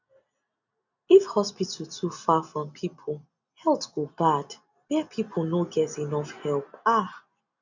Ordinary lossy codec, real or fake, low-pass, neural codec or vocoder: none; real; 7.2 kHz; none